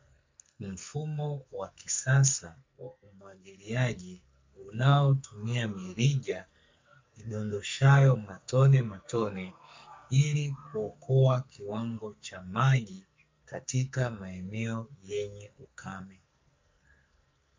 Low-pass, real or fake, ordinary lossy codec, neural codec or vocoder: 7.2 kHz; fake; MP3, 64 kbps; codec, 44.1 kHz, 2.6 kbps, SNAC